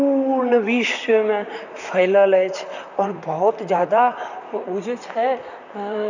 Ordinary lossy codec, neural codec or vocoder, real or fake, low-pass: none; vocoder, 44.1 kHz, 128 mel bands, Pupu-Vocoder; fake; 7.2 kHz